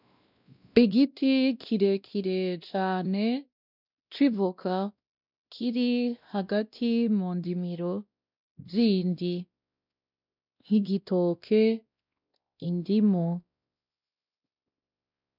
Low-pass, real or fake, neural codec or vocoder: 5.4 kHz; fake; codec, 16 kHz, 1 kbps, X-Codec, WavLM features, trained on Multilingual LibriSpeech